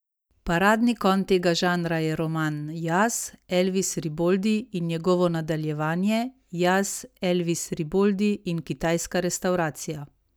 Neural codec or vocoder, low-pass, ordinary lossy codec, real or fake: none; none; none; real